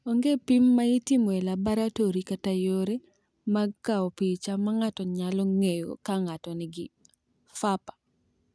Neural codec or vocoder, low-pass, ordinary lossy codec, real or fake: none; none; none; real